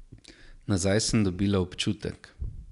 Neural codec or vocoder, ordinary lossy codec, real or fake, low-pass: none; none; real; 10.8 kHz